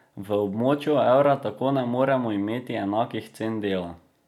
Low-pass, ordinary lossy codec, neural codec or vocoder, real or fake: 19.8 kHz; none; none; real